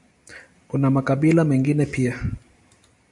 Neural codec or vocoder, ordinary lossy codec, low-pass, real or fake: none; MP3, 64 kbps; 10.8 kHz; real